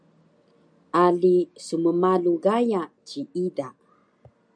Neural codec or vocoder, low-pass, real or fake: none; 9.9 kHz; real